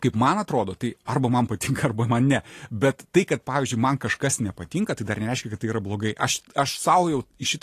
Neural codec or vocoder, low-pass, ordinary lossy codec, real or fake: none; 14.4 kHz; AAC, 48 kbps; real